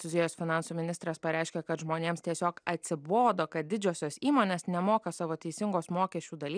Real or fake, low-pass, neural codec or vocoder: real; 9.9 kHz; none